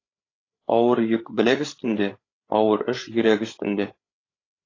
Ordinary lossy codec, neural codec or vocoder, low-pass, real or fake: AAC, 32 kbps; codec, 16 kHz, 8 kbps, FreqCodec, larger model; 7.2 kHz; fake